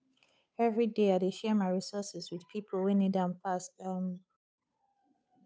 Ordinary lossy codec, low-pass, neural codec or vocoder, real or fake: none; none; codec, 16 kHz, 8 kbps, FunCodec, trained on Chinese and English, 25 frames a second; fake